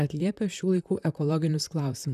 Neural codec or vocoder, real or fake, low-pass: vocoder, 44.1 kHz, 128 mel bands, Pupu-Vocoder; fake; 14.4 kHz